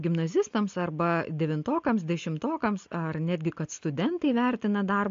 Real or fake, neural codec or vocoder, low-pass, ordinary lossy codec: real; none; 7.2 kHz; MP3, 48 kbps